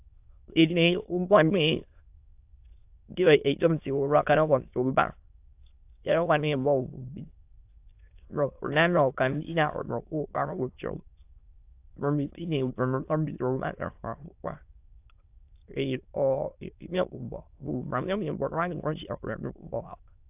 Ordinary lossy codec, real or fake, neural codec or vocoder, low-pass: AAC, 32 kbps; fake; autoencoder, 22.05 kHz, a latent of 192 numbers a frame, VITS, trained on many speakers; 3.6 kHz